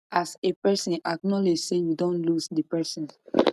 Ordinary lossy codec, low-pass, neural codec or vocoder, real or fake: none; 14.4 kHz; none; real